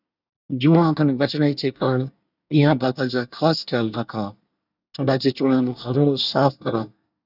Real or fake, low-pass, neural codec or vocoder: fake; 5.4 kHz; codec, 24 kHz, 1 kbps, SNAC